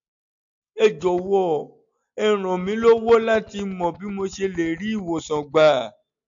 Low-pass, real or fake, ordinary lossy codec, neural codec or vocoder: 7.2 kHz; real; MP3, 64 kbps; none